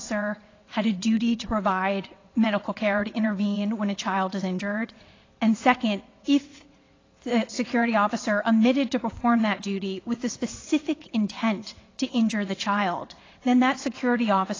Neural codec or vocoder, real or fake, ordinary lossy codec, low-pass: vocoder, 22.05 kHz, 80 mel bands, WaveNeXt; fake; AAC, 32 kbps; 7.2 kHz